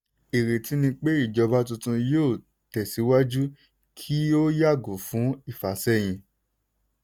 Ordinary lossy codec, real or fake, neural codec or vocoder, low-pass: none; real; none; none